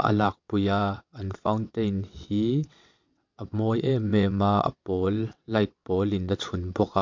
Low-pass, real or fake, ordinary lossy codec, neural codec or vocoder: 7.2 kHz; fake; MP3, 48 kbps; vocoder, 22.05 kHz, 80 mel bands, WaveNeXt